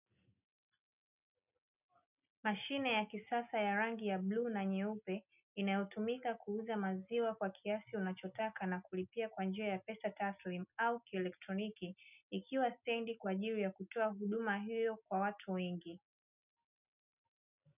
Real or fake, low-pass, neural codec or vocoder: real; 3.6 kHz; none